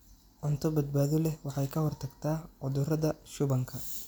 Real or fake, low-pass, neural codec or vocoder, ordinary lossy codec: real; none; none; none